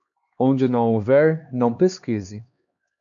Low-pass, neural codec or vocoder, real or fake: 7.2 kHz; codec, 16 kHz, 2 kbps, X-Codec, HuBERT features, trained on LibriSpeech; fake